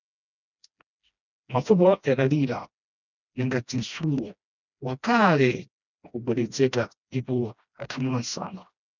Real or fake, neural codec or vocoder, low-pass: fake; codec, 16 kHz, 1 kbps, FreqCodec, smaller model; 7.2 kHz